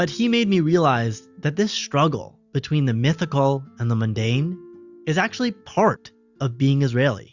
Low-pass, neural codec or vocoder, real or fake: 7.2 kHz; none; real